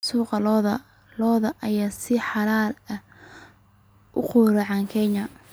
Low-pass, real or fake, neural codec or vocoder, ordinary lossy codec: none; real; none; none